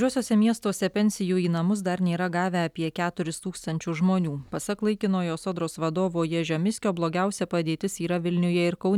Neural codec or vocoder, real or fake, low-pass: none; real; 19.8 kHz